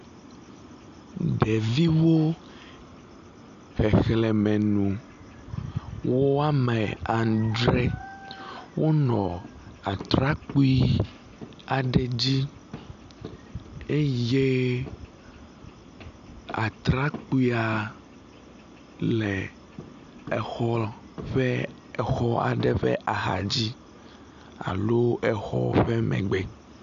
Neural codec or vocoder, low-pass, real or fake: none; 7.2 kHz; real